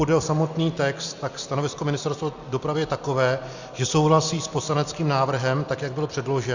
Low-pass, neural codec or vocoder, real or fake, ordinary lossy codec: 7.2 kHz; none; real; Opus, 64 kbps